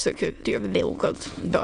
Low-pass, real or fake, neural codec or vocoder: 9.9 kHz; fake; autoencoder, 22.05 kHz, a latent of 192 numbers a frame, VITS, trained on many speakers